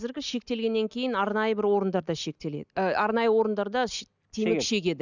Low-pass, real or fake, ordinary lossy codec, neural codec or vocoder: 7.2 kHz; real; none; none